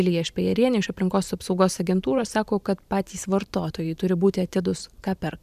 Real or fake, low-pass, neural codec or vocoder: fake; 14.4 kHz; vocoder, 44.1 kHz, 128 mel bands every 512 samples, BigVGAN v2